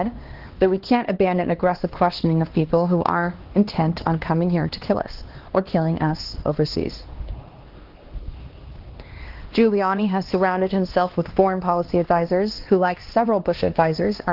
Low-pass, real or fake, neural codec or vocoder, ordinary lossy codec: 5.4 kHz; fake; codec, 16 kHz, 2 kbps, X-Codec, HuBERT features, trained on LibriSpeech; Opus, 16 kbps